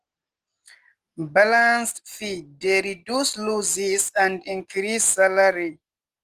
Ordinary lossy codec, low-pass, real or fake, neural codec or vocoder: Opus, 24 kbps; 14.4 kHz; real; none